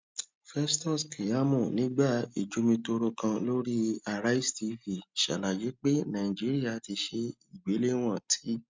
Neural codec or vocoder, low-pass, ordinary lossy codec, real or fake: none; 7.2 kHz; MP3, 64 kbps; real